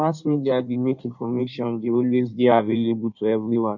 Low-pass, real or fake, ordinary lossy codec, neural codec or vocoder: 7.2 kHz; fake; none; codec, 16 kHz in and 24 kHz out, 1.1 kbps, FireRedTTS-2 codec